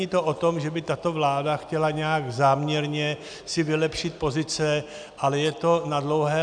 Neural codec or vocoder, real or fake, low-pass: none; real; 9.9 kHz